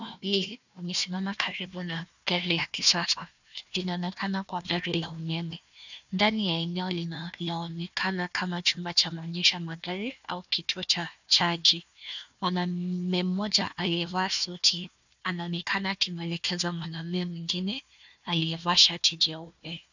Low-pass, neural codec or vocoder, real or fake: 7.2 kHz; codec, 16 kHz, 1 kbps, FunCodec, trained on Chinese and English, 50 frames a second; fake